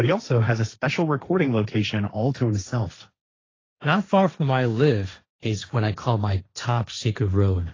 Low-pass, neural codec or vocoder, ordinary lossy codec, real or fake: 7.2 kHz; codec, 16 kHz, 1.1 kbps, Voila-Tokenizer; AAC, 32 kbps; fake